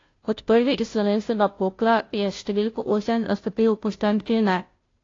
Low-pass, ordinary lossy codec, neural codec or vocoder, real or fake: 7.2 kHz; AAC, 32 kbps; codec, 16 kHz, 0.5 kbps, FunCodec, trained on Chinese and English, 25 frames a second; fake